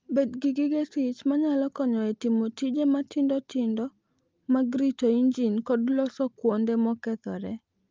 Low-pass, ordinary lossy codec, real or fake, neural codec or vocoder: 7.2 kHz; Opus, 24 kbps; real; none